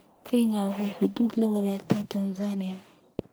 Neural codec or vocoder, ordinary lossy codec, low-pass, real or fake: codec, 44.1 kHz, 1.7 kbps, Pupu-Codec; none; none; fake